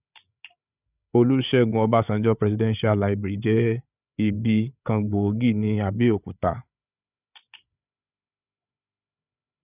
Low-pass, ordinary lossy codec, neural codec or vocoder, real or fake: 3.6 kHz; none; codec, 16 kHz, 8 kbps, FreqCodec, larger model; fake